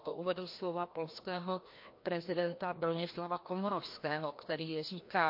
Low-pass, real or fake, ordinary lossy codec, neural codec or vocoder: 5.4 kHz; fake; MP3, 32 kbps; codec, 16 kHz, 1 kbps, FreqCodec, larger model